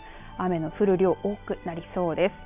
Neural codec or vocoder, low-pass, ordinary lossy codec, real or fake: none; 3.6 kHz; none; real